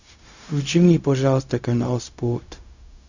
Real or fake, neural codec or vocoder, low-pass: fake; codec, 16 kHz, 0.4 kbps, LongCat-Audio-Codec; 7.2 kHz